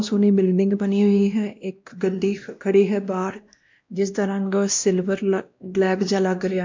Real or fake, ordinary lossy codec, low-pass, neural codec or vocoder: fake; MP3, 64 kbps; 7.2 kHz; codec, 16 kHz, 1 kbps, X-Codec, WavLM features, trained on Multilingual LibriSpeech